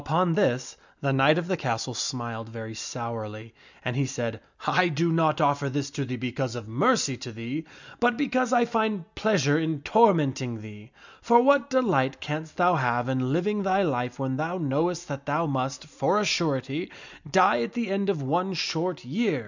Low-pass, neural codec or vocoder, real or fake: 7.2 kHz; none; real